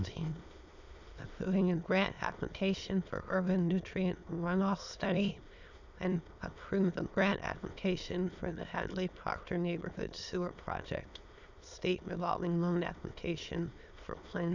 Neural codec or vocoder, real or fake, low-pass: autoencoder, 22.05 kHz, a latent of 192 numbers a frame, VITS, trained on many speakers; fake; 7.2 kHz